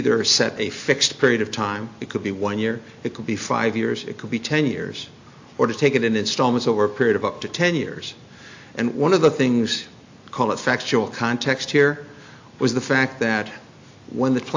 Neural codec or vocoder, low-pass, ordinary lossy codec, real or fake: none; 7.2 kHz; AAC, 48 kbps; real